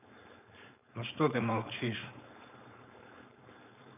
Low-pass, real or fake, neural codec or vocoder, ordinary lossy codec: 3.6 kHz; fake; codec, 16 kHz, 4.8 kbps, FACodec; none